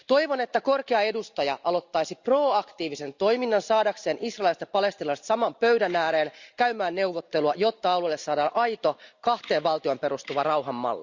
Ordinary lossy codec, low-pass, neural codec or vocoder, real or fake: Opus, 64 kbps; 7.2 kHz; none; real